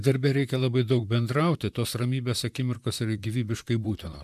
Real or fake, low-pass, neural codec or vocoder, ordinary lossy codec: fake; 14.4 kHz; vocoder, 44.1 kHz, 128 mel bands, Pupu-Vocoder; MP3, 96 kbps